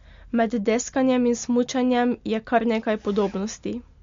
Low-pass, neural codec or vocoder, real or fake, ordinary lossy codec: 7.2 kHz; none; real; MP3, 48 kbps